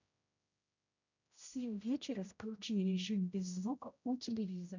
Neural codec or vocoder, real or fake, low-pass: codec, 16 kHz, 0.5 kbps, X-Codec, HuBERT features, trained on general audio; fake; 7.2 kHz